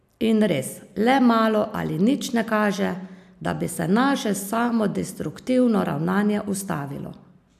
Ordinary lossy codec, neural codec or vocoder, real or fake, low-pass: AAC, 96 kbps; none; real; 14.4 kHz